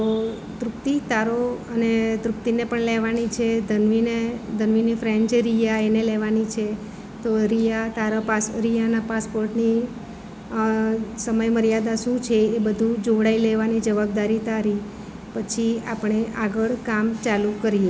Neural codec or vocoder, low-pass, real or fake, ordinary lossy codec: none; none; real; none